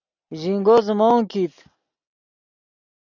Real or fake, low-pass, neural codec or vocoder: real; 7.2 kHz; none